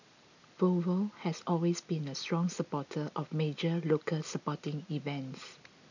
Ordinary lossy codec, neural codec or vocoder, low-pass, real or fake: none; none; 7.2 kHz; real